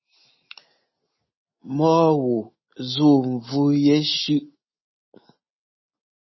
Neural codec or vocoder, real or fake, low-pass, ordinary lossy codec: none; real; 7.2 kHz; MP3, 24 kbps